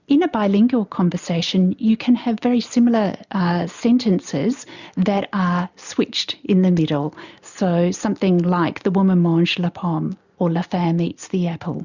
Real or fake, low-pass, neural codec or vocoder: real; 7.2 kHz; none